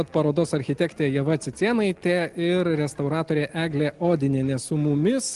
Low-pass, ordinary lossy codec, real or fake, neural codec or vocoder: 10.8 kHz; Opus, 24 kbps; real; none